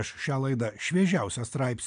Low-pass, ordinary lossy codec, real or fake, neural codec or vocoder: 9.9 kHz; MP3, 96 kbps; real; none